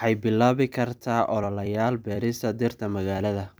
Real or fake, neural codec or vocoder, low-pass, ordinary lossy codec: fake; vocoder, 44.1 kHz, 128 mel bands every 256 samples, BigVGAN v2; none; none